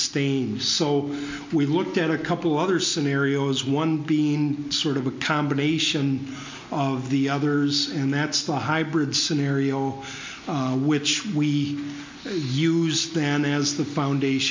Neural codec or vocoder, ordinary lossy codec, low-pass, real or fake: none; MP3, 48 kbps; 7.2 kHz; real